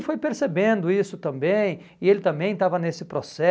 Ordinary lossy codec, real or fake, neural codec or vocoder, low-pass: none; real; none; none